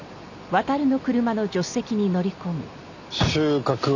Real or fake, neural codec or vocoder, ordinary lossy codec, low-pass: real; none; none; 7.2 kHz